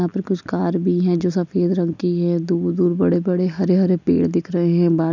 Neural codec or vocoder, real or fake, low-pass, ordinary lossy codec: none; real; 7.2 kHz; none